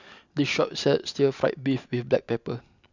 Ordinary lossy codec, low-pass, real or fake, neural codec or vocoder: none; 7.2 kHz; real; none